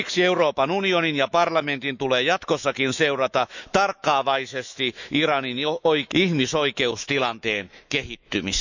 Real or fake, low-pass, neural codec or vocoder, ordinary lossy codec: fake; 7.2 kHz; autoencoder, 48 kHz, 128 numbers a frame, DAC-VAE, trained on Japanese speech; none